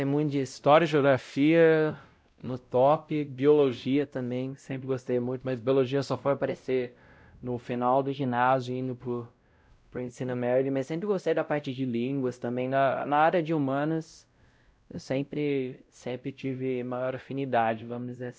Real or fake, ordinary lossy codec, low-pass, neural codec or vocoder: fake; none; none; codec, 16 kHz, 0.5 kbps, X-Codec, WavLM features, trained on Multilingual LibriSpeech